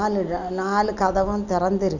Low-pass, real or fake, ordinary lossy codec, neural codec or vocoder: 7.2 kHz; real; none; none